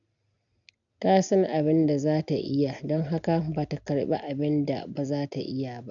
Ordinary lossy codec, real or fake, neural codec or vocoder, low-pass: none; real; none; 7.2 kHz